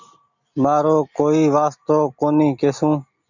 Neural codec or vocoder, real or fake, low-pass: none; real; 7.2 kHz